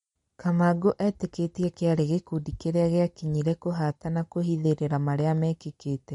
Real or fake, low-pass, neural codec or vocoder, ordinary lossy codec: real; 10.8 kHz; none; MP3, 48 kbps